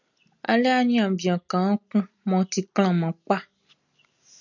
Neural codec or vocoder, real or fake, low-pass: none; real; 7.2 kHz